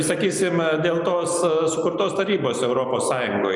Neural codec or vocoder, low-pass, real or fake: none; 10.8 kHz; real